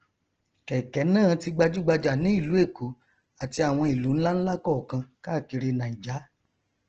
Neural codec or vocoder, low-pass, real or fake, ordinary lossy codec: none; 7.2 kHz; real; Opus, 16 kbps